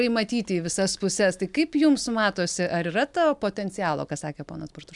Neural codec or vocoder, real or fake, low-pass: none; real; 10.8 kHz